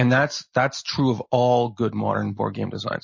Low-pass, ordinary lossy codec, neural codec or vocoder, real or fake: 7.2 kHz; MP3, 32 kbps; none; real